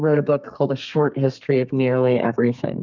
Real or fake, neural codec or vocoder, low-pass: fake; codec, 32 kHz, 1.9 kbps, SNAC; 7.2 kHz